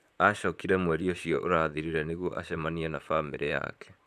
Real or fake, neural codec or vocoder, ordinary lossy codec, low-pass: fake; vocoder, 44.1 kHz, 128 mel bands, Pupu-Vocoder; none; 14.4 kHz